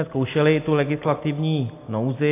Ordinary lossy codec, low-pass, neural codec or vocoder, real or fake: AAC, 24 kbps; 3.6 kHz; none; real